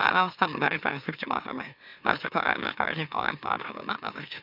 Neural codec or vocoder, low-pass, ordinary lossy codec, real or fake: autoencoder, 44.1 kHz, a latent of 192 numbers a frame, MeloTTS; 5.4 kHz; none; fake